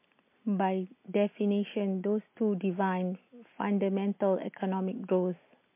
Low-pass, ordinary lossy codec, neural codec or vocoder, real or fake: 3.6 kHz; MP3, 24 kbps; none; real